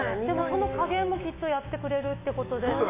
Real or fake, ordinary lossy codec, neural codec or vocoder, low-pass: fake; MP3, 24 kbps; autoencoder, 48 kHz, 128 numbers a frame, DAC-VAE, trained on Japanese speech; 3.6 kHz